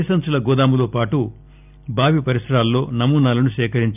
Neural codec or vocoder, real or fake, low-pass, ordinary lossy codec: none; real; 3.6 kHz; none